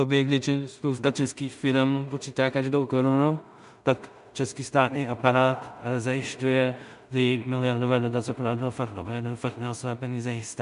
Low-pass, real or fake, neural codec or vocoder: 10.8 kHz; fake; codec, 16 kHz in and 24 kHz out, 0.4 kbps, LongCat-Audio-Codec, two codebook decoder